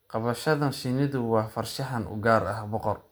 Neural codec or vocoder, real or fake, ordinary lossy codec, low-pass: none; real; none; none